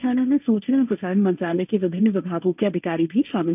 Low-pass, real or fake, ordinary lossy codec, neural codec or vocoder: 3.6 kHz; fake; none; codec, 16 kHz, 1.1 kbps, Voila-Tokenizer